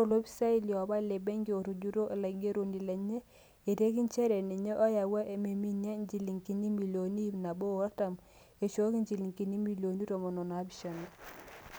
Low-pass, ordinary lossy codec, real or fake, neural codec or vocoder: none; none; real; none